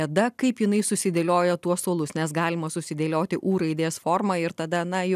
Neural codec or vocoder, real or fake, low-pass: none; real; 14.4 kHz